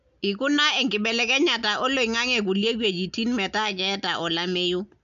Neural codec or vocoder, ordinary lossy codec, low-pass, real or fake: none; MP3, 64 kbps; 7.2 kHz; real